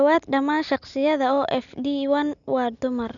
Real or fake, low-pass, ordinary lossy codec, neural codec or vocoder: real; 7.2 kHz; none; none